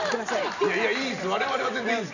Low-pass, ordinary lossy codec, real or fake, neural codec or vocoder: 7.2 kHz; none; real; none